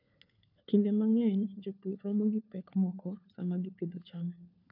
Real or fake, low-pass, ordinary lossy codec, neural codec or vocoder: fake; 5.4 kHz; AAC, 48 kbps; codec, 16 kHz, 4 kbps, FunCodec, trained on LibriTTS, 50 frames a second